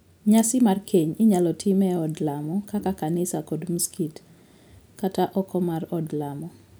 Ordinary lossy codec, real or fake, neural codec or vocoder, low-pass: none; real; none; none